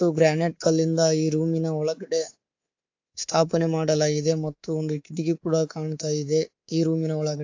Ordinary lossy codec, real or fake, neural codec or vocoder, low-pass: AAC, 48 kbps; fake; codec, 24 kHz, 3.1 kbps, DualCodec; 7.2 kHz